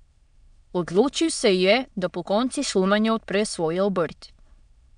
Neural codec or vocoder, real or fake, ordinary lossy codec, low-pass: autoencoder, 22.05 kHz, a latent of 192 numbers a frame, VITS, trained on many speakers; fake; MP3, 96 kbps; 9.9 kHz